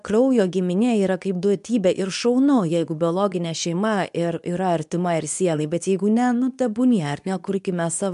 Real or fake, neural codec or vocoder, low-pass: fake; codec, 24 kHz, 0.9 kbps, WavTokenizer, medium speech release version 2; 10.8 kHz